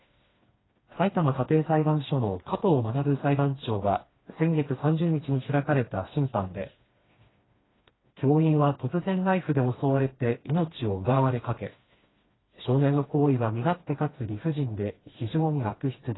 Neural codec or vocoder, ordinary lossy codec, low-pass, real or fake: codec, 16 kHz, 2 kbps, FreqCodec, smaller model; AAC, 16 kbps; 7.2 kHz; fake